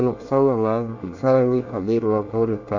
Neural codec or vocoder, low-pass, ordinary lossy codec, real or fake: codec, 24 kHz, 1 kbps, SNAC; 7.2 kHz; AAC, 48 kbps; fake